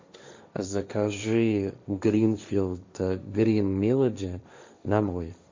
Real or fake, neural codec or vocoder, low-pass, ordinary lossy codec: fake; codec, 16 kHz, 1.1 kbps, Voila-Tokenizer; 7.2 kHz; MP3, 64 kbps